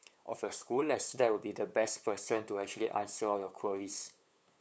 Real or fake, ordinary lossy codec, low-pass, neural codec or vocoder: fake; none; none; codec, 16 kHz, 8 kbps, FunCodec, trained on LibriTTS, 25 frames a second